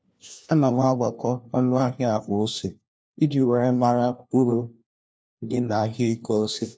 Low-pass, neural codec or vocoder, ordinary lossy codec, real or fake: none; codec, 16 kHz, 1 kbps, FunCodec, trained on LibriTTS, 50 frames a second; none; fake